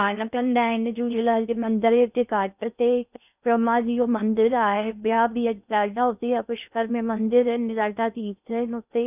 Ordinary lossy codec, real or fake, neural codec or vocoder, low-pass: none; fake; codec, 16 kHz in and 24 kHz out, 0.6 kbps, FocalCodec, streaming, 4096 codes; 3.6 kHz